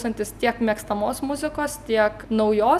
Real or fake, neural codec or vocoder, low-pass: real; none; 14.4 kHz